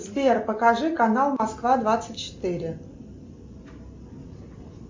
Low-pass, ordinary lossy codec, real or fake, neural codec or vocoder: 7.2 kHz; MP3, 64 kbps; real; none